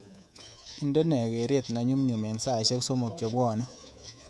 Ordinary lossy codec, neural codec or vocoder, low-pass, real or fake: none; codec, 24 kHz, 3.1 kbps, DualCodec; none; fake